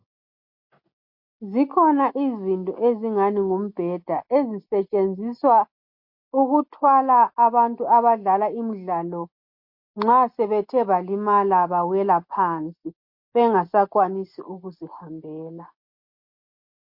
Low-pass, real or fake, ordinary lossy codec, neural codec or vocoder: 5.4 kHz; real; MP3, 32 kbps; none